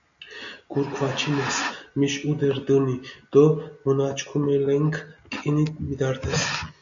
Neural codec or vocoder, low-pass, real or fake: none; 7.2 kHz; real